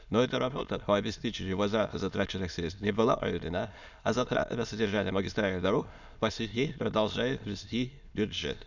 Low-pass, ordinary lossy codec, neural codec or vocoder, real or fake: 7.2 kHz; none; autoencoder, 22.05 kHz, a latent of 192 numbers a frame, VITS, trained on many speakers; fake